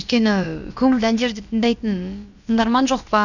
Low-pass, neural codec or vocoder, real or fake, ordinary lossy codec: 7.2 kHz; codec, 16 kHz, about 1 kbps, DyCAST, with the encoder's durations; fake; none